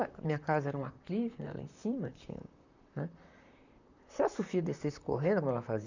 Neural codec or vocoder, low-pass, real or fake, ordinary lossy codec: vocoder, 44.1 kHz, 128 mel bands, Pupu-Vocoder; 7.2 kHz; fake; none